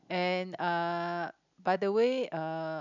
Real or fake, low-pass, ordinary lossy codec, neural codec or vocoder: real; 7.2 kHz; none; none